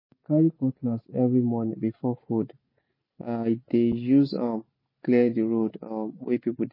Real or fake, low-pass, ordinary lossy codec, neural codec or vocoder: real; 5.4 kHz; MP3, 24 kbps; none